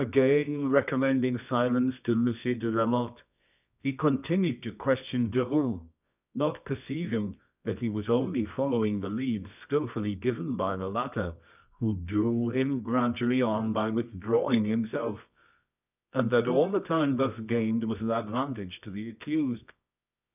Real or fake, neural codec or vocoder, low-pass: fake; codec, 24 kHz, 0.9 kbps, WavTokenizer, medium music audio release; 3.6 kHz